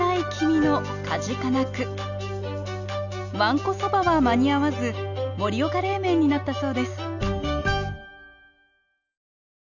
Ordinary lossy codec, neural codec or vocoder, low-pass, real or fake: none; none; 7.2 kHz; real